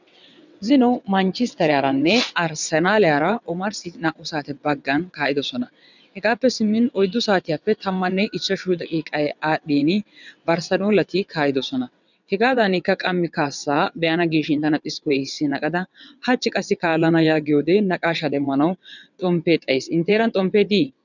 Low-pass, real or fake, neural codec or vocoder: 7.2 kHz; fake; vocoder, 22.05 kHz, 80 mel bands, WaveNeXt